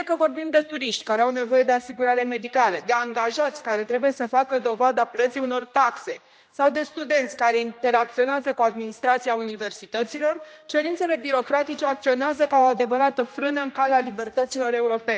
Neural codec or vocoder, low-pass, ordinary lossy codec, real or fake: codec, 16 kHz, 1 kbps, X-Codec, HuBERT features, trained on general audio; none; none; fake